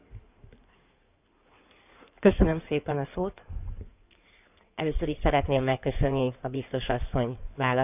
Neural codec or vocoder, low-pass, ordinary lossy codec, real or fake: codec, 16 kHz in and 24 kHz out, 2.2 kbps, FireRedTTS-2 codec; 3.6 kHz; none; fake